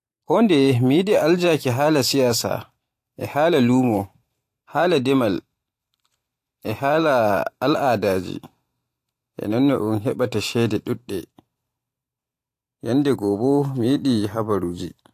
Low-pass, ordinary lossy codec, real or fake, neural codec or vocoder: 14.4 kHz; AAC, 64 kbps; fake; vocoder, 44.1 kHz, 128 mel bands every 512 samples, BigVGAN v2